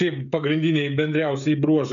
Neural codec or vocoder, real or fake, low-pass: codec, 16 kHz, 16 kbps, FreqCodec, smaller model; fake; 7.2 kHz